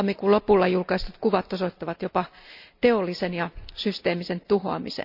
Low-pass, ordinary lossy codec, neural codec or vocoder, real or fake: 5.4 kHz; none; none; real